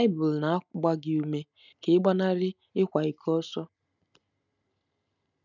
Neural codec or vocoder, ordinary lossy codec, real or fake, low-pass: none; none; real; 7.2 kHz